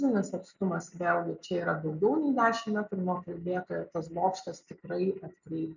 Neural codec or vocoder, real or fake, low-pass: none; real; 7.2 kHz